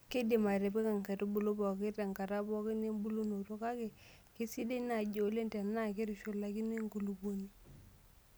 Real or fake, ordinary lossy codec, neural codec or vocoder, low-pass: real; none; none; none